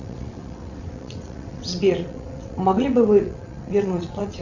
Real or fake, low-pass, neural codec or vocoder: fake; 7.2 kHz; vocoder, 22.05 kHz, 80 mel bands, WaveNeXt